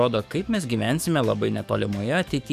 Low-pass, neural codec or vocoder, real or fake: 14.4 kHz; codec, 44.1 kHz, 7.8 kbps, DAC; fake